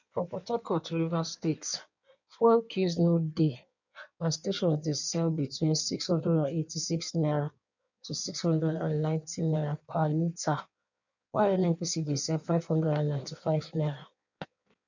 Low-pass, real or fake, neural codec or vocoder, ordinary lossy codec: 7.2 kHz; fake; codec, 16 kHz in and 24 kHz out, 1.1 kbps, FireRedTTS-2 codec; none